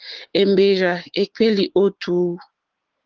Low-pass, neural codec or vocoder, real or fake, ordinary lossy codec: 7.2 kHz; codec, 16 kHz, 6 kbps, DAC; fake; Opus, 16 kbps